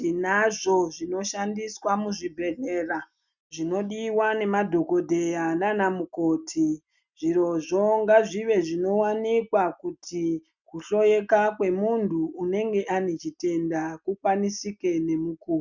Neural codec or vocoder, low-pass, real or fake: none; 7.2 kHz; real